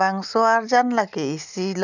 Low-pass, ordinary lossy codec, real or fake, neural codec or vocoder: 7.2 kHz; none; real; none